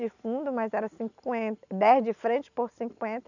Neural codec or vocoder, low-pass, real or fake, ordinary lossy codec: none; 7.2 kHz; real; none